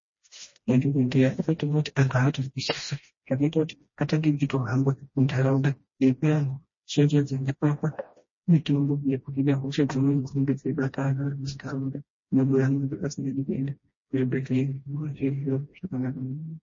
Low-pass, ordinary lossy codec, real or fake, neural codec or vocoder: 7.2 kHz; MP3, 32 kbps; fake; codec, 16 kHz, 1 kbps, FreqCodec, smaller model